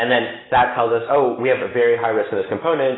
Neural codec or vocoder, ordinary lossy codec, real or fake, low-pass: none; AAC, 16 kbps; real; 7.2 kHz